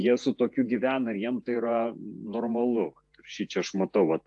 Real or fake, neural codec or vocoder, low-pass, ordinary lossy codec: fake; vocoder, 48 kHz, 128 mel bands, Vocos; 10.8 kHz; AAC, 64 kbps